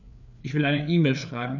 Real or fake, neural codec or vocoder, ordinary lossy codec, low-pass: fake; codec, 16 kHz, 4 kbps, FreqCodec, larger model; none; 7.2 kHz